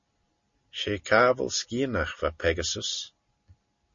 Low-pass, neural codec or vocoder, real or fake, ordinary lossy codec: 7.2 kHz; none; real; MP3, 32 kbps